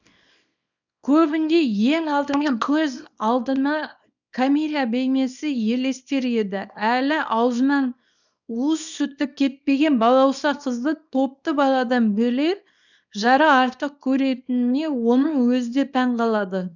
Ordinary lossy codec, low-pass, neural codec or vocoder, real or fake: none; 7.2 kHz; codec, 24 kHz, 0.9 kbps, WavTokenizer, small release; fake